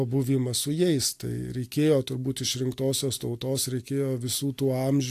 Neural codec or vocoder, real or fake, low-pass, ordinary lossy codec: none; real; 14.4 kHz; AAC, 64 kbps